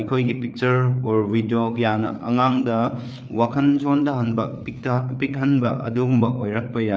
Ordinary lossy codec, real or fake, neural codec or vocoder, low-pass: none; fake; codec, 16 kHz, 4 kbps, FreqCodec, larger model; none